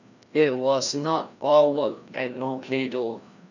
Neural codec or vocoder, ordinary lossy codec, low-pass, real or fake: codec, 16 kHz, 1 kbps, FreqCodec, larger model; none; 7.2 kHz; fake